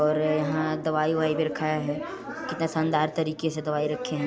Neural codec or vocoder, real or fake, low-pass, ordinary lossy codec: none; real; none; none